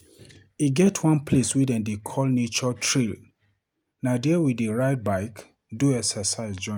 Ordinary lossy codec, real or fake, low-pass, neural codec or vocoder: none; real; none; none